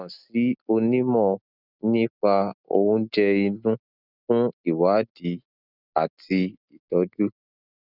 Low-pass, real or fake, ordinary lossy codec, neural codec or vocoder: 5.4 kHz; real; none; none